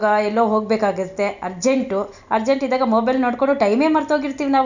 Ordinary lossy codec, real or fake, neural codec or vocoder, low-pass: none; real; none; 7.2 kHz